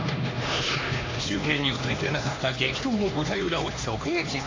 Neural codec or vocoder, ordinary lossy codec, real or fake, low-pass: codec, 16 kHz, 2 kbps, X-Codec, HuBERT features, trained on LibriSpeech; AAC, 32 kbps; fake; 7.2 kHz